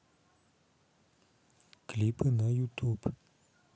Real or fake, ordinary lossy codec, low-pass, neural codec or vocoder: real; none; none; none